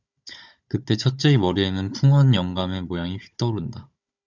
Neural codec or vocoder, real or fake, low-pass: codec, 16 kHz, 16 kbps, FunCodec, trained on Chinese and English, 50 frames a second; fake; 7.2 kHz